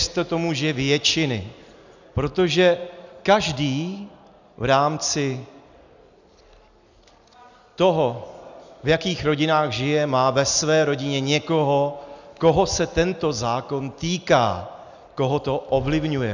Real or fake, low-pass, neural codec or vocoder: real; 7.2 kHz; none